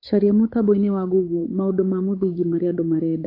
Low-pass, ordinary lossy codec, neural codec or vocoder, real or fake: 5.4 kHz; AAC, 32 kbps; codec, 16 kHz, 8 kbps, FunCodec, trained on Chinese and English, 25 frames a second; fake